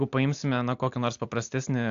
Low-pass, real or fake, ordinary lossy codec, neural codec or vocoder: 7.2 kHz; real; AAC, 64 kbps; none